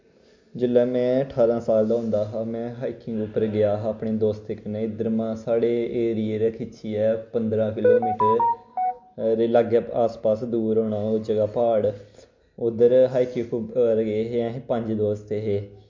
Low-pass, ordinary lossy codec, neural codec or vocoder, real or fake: 7.2 kHz; MP3, 48 kbps; none; real